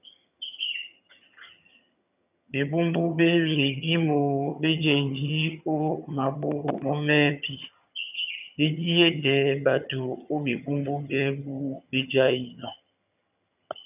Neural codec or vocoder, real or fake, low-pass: vocoder, 22.05 kHz, 80 mel bands, HiFi-GAN; fake; 3.6 kHz